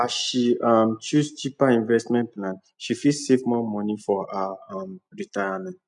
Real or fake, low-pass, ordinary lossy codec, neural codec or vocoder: real; 10.8 kHz; none; none